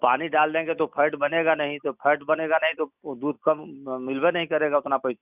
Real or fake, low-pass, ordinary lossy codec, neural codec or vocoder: real; 3.6 kHz; none; none